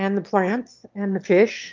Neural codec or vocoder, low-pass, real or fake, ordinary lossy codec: autoencoder, 22.05 kHz, a latent of 192 numbers a frame, VITS, trained on one speaker; 7.2 kHz; fake; Opus, 32 kbps